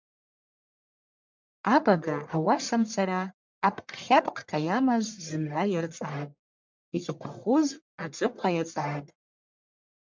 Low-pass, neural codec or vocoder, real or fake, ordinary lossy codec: 7.2 kHz; codec, 44.1 kHz, 1.7 kbps, Pupu-Codec; fake; MP3, 64 kbps